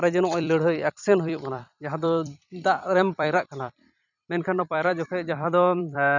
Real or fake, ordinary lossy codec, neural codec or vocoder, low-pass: fake; none; vocoder, 44.1 kHz, 128 mel bands every 256 samples, BigVGAN v2; 7.2 kHz